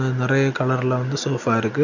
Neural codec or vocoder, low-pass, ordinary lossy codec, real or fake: none; 7.2 kHz; none; real